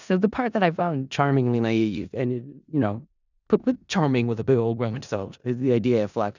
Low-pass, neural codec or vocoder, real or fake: 7.2 kHz; codec, 16 kHz in and 24 kHz out, 0.4 kbps, LongCat-Audio-Codec, four codebook decoder; fake